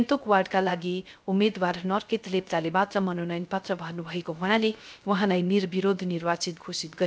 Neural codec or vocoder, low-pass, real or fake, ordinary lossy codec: codec, 16 kHz, 0.3 kbps, FocalCodec; none; fake; none